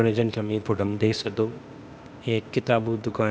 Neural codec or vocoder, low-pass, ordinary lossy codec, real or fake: codec, 16 kHz, 0.8 kbps, ZipCodec; none; none; fake